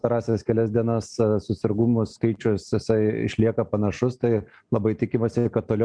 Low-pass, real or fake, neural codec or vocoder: 9.9 kHz; real; none